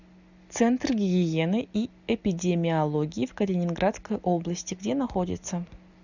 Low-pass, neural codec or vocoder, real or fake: 7.2 kHz; none; real